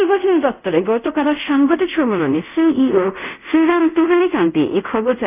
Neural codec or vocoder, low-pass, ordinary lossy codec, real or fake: codec, 24 kHz, 0.5 kbps, DualCodec; 3.6 kHz; none; fake